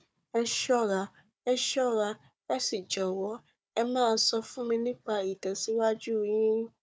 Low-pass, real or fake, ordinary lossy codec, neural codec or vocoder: none; fake; none; codec, 16 kHz, 4 kbps, FunCodec, trained on Chinese and English, 50 frames a second